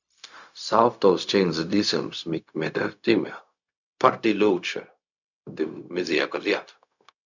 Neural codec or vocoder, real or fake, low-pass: codec, 16 kHz, 0.4 kbps, LongCat-Audio-Codec; fake; 7.2 kHz